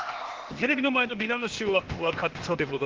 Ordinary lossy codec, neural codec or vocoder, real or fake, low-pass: Opus, 16 kbps; codec, 16 kHz, 0.8 kbps, ZipCodec; fake; 7.2 kHz